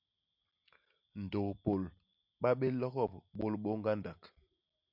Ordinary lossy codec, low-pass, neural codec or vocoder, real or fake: MP3, 48 kbps; 5.4 kHz; none; real